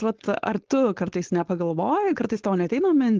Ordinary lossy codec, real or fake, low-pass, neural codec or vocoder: Opus, 16 kbps; fake; 7.2 kHz; codec, 16 kHz, 4.8 kbps, FACodec